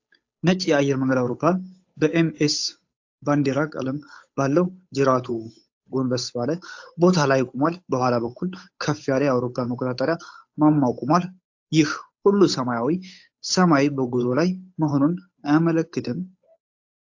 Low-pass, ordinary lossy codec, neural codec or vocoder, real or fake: 7.2 kHz; AAC, 48 kbps; codec, 16 kHz, 8 kbps, FunCodec, trained on Chinese and English, 25 frames a second; fake